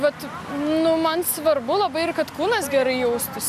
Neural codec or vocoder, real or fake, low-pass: none; real; 14.4 kHz